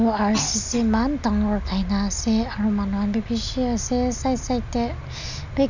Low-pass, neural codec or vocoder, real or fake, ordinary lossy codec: 7.2 kHz; none; real; none